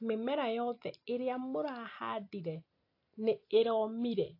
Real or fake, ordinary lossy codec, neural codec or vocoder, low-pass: real; AAC, 32 kbps; none; 5.4 kHz